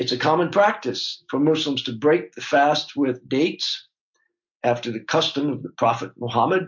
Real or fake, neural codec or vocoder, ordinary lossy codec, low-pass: real; none; MP3, 48 kbps; 7.2 kHz